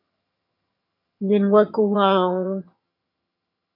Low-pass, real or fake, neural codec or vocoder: 5.4 kHz; fake; vocoder, 22.05 kHz, 80 mel bands, HiFi-GAN